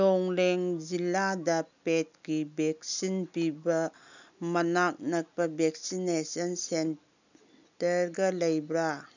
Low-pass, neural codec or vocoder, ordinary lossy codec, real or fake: 7.2 kHz; none; none; real